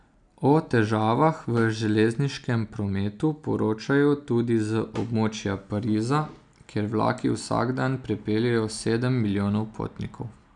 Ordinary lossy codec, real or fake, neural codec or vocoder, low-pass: none; real; none; 9.9 kHz